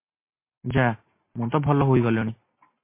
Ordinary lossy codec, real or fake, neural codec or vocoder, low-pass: MP3, 24 kbps; real; none; 3.6 kHz